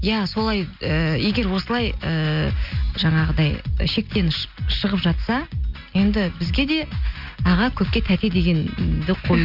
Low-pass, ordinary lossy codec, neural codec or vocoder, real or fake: 5.4 kHz; none; none; real